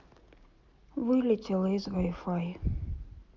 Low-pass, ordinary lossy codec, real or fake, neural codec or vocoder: 7.2 kHz; Opus, 24 kbps; real; none